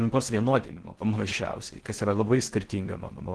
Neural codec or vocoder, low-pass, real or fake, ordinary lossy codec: codec, 16 kHz in and 24 kHz out, 0.6 kbps, FocalCodec, streaming, 4096 codes; 10.8 kHz; fake; Opus, 16 kbps